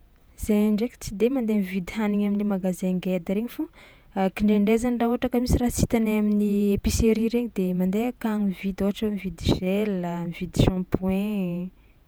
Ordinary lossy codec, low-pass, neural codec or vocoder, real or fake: none; none; vocoder, 48 kHz, 128 mel bands, Vocos; fake